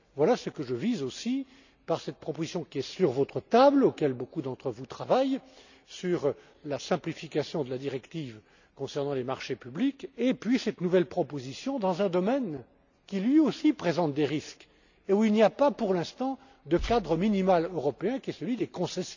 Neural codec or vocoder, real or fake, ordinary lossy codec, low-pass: none; real; none; 7.2 kHz